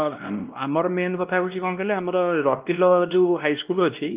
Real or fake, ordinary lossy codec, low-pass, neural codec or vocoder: fake; Opus, 24 kbps; 3.6 kHz; codec, 16 kHz, 1 kbps, X-Codec, WavLM features, trained on Multilingual LibriSpeech